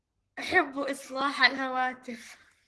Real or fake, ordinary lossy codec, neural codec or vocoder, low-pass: fake; Opus, 16 kbps; codec, 44.1 kHz, 7.8 kbps, Pupu-Codec; 10.8 kHz